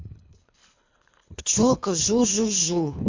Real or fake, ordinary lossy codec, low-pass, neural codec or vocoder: fake; MP3, 64 kbps; 7.2 kHz; codec, 24 kHz, 3 kbps, HILCodec